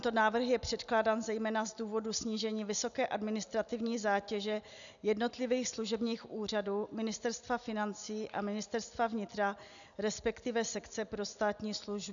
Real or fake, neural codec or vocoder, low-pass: real; none; 7.2 kHz